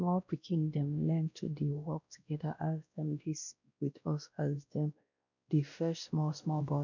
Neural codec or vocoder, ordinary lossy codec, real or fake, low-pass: codec, 16 kHz, 1 kbps, X-Codec, WavLM features, trained on Multilingual LibriSpeech; none; fake; 7.2 kHz